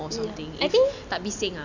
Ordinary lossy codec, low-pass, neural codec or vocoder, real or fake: none; 7.2 kHz; none; real